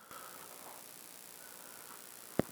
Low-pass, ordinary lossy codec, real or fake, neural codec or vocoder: none; none; real; none